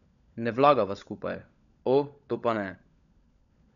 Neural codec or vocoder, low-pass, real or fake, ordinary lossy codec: codec, 16 kHz, 16 kbps, FunCodec, trained on LibriTTS, 50 frames a second; 7.2 kHz; fake; none